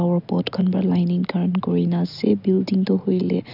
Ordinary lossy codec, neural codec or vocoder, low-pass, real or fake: none; none; 5.4 kHz; real